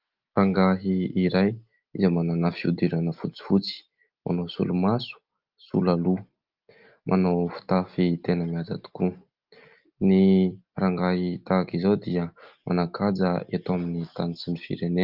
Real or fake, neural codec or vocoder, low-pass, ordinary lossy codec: real; none; 5.4 kHz; Opus, 32 kbps